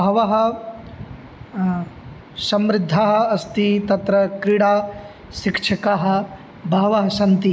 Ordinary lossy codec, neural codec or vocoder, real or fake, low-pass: none; none; real; none